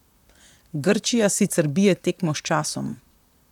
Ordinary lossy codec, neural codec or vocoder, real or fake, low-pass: none; vocoder, 44.1 kHz, 128 mel bands, Pupu-Vocoder; fake; 19.8 kHz